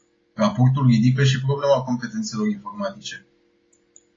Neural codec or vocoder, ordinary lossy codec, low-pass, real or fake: none; AAC, 32 kbps; 7.2 kHz; real